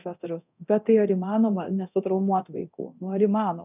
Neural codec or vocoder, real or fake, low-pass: codec, 24 kHz, 0.9 kbps, DualCodec; fake; 3.6 kHz